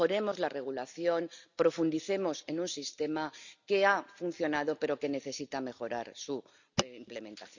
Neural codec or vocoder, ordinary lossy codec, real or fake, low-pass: none; none; real; 7.2 kHz